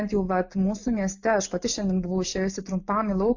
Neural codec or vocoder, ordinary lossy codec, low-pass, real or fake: none; AAC, 48 kbps; 7.2 kHz; real